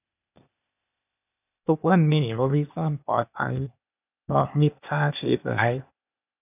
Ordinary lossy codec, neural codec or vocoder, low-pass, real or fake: none; codec, 16 kHz, 0.8 kbps, ZipCodec; 3.6 kHz; fake